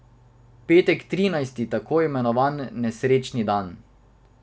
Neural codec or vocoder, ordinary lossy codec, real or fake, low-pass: none; none; real; none